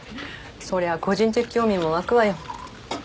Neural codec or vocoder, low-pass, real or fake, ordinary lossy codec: none; none; real; none